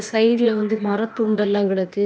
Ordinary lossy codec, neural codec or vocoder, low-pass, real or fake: none; codec, 16 kHz, 0.8 kbps, ZipCodec; none; fake